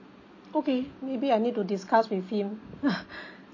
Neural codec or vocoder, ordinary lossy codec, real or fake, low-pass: none; MP3, 32 kbps; real; 7.2 kHz